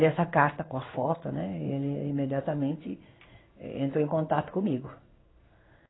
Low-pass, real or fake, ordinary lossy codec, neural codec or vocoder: 7.2 kHz; fake; AAC, 16 kbps; codec, 16 kHz in and 24 kHz out, 1 kbps, XY-Tokenizer